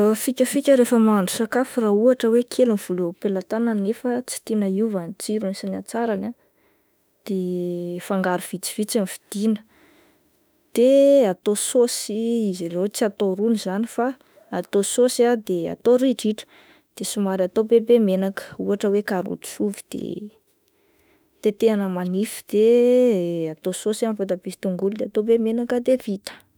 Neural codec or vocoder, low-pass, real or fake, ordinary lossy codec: autoencoder, 48 kHz, 32 numbers a frame, DAC-VAE, trained on Japanese speech; none; fake; none